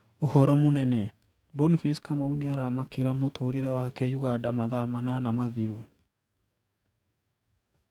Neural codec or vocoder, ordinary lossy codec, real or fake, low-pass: codec, 44.1 kHz, 2.6 kbps, DAC; none; fake; 19.8 kHz